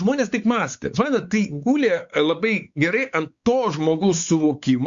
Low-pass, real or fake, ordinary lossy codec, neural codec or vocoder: 7.2 kHz; fake; Opus, 64 kbps; codec, 16 kHz, 4 kbps, X-Codec, WavLM features, trained on Multilingual LibriSpeech